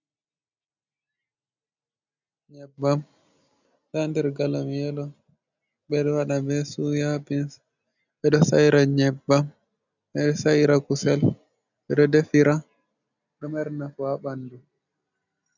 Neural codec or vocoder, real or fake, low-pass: none; real; 7.2 kHz